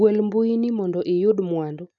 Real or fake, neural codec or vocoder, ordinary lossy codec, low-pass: real; none; none; 7.2 kHz